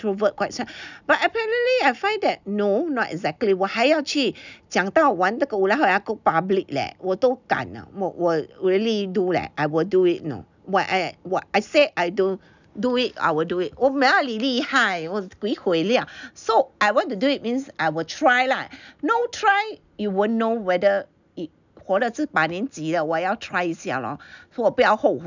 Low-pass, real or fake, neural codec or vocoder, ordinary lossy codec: 7.2 kHz; real; none; none